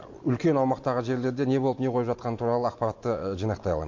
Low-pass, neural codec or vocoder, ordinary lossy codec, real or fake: 7.2 kHz; none; MP3, 48 kbps; real